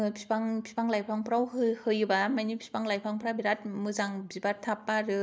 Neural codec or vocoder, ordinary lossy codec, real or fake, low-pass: none; none; real; none